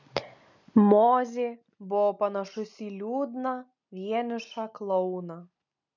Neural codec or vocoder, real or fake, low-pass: none; real; 7.2 kHz